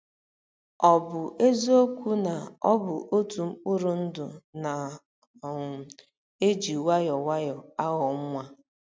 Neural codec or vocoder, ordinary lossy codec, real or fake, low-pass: none; none; real; none